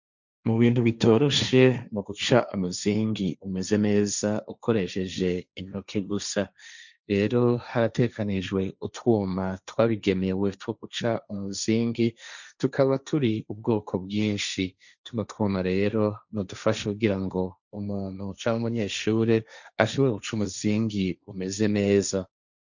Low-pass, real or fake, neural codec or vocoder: 7.2 kHz; fake; codec, 16 kHz, 1.1 kbps, Voila-Tokenizer